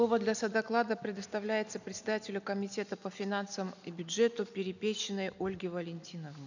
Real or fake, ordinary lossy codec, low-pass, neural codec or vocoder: real; none; 7.2 kHz; none